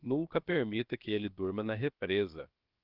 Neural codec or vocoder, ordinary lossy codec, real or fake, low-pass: codec, 16 kHz, 0.7 kbps, FocalCodec; Opus, 32 kbps; fake; 5.4 kHz